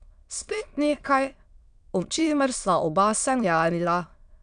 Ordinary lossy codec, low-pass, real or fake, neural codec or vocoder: none; 9.9 kHz; fake; autoencoder, 22.05 kHz, a latent of 192 numbers a frame, VITS, trained on many speakers